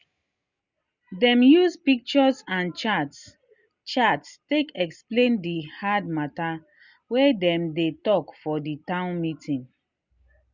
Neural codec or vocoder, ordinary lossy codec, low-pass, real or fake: none; Opus, 64 kbps; 7.2 kHz; real